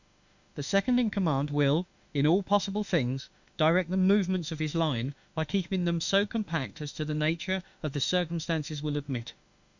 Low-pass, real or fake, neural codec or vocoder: 7.2 kHz; fake; autoencoder, 48 kHz, 32 numbers a frame, DAC-VAE, trained on Japanese speech